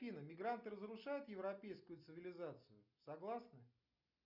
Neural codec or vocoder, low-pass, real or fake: none; 5.4 kHz; real